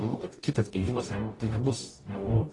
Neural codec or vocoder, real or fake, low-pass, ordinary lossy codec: codec, 44.1 kHz, 0.9 kbps, DAC; fake; 10.8 kHz; AAC, 32 kbps